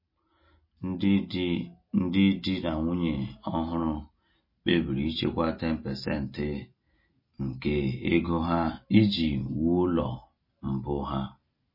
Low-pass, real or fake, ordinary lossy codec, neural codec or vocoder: 5.4 kHz; real; MP3, 24 kbps; none